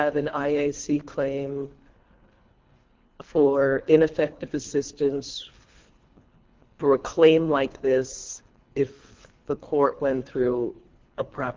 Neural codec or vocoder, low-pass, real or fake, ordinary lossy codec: codec, 24 kHz, 3 kbps, HILCodec; 7.2 kHz; fake; Opus, 32 kbps